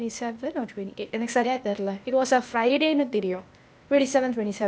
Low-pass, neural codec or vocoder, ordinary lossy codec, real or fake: none; codec, 16 kHz, 0.8 kbps, ZipCodec; none; fake